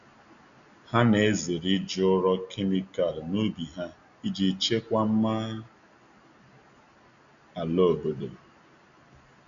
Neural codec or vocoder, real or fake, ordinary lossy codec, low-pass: none; real; none; 7.2 kHz